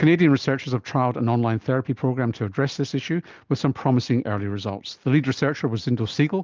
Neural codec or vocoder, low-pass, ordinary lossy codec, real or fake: none; 7.2 kHz; Opus, 32 kbps; real